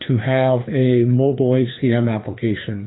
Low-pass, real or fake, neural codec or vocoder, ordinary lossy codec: 7.2 kHz; fake; codec, 16 kHz, 2 kbps, FreqCodec, larger model; AAC, 16 kbps